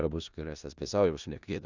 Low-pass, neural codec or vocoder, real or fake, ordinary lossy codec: 7.2 kHz; codec, 16 kHz in and 24 kHz out, 0.4 kbps, LongCat-Audio-Codec, four codebook decoder; fake; Opus, 64 kbps